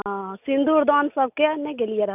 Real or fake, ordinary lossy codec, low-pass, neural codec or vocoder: real; none; 3.6 kHz; none